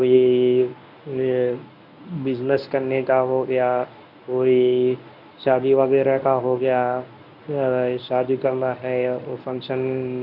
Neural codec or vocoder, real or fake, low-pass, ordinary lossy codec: codec, 24 kHz, 0.9 kbps, WavTokenizer, medium speech release version 1; fake; 5.4 kHz; none